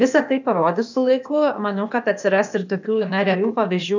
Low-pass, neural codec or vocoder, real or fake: 7.2 kHz; codec, 16 kHz, 0.8 kbps, ZipCodec; fake